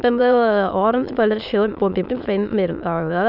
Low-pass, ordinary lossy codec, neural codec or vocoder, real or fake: 5.4 kHz; none; autoencoder, 22.05 kHz, a latent of 192 numbers a frame, VITS, trained on many speakers; fake